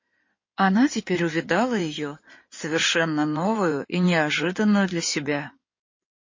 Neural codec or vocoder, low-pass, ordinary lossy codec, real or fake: codec, 16 kHz in and 24 kHz out, 2.2 kbps, FireRedTTS-2 codec; 7.2 kHz; MP3, 32 kbps; fake